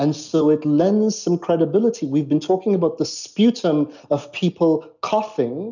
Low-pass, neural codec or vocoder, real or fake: 7.2 kHz; none; real